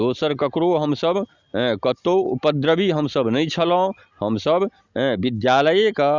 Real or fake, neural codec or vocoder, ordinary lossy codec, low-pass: real; none; Opus, 64 kbps; 7.2 kHz